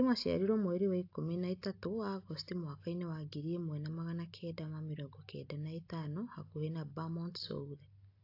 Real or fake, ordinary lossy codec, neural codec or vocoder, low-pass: real; AAC, 32 kbps; none; 5.4 kHz